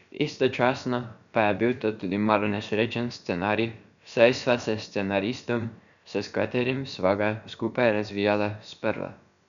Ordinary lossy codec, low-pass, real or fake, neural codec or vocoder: none; 7.2 kHz; fake; codec, 16 kHz, about 1 kbps, DyCAST, with the encoder's durations